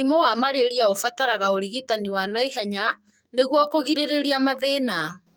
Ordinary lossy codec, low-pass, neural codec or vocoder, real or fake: none; none; codec, 44.1 kHz, 2.6 kbps, SNAC; fake